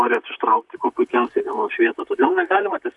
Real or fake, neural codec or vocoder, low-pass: fake; vocoder, 44.1 kHz, 128 mel bands, Pupu-Vocoder; 10.8 kHz